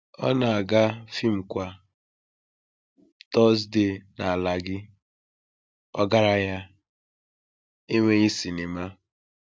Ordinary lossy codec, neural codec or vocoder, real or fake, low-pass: none; none; real; none